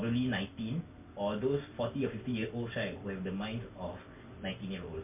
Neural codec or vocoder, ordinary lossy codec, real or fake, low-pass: none; MP3, 32 kbps; real; 3.6 kHz